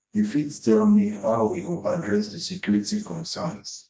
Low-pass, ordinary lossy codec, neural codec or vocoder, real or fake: none; none; codec, 16 kHz, 1 kbps, FreqCodec, smaller model; fake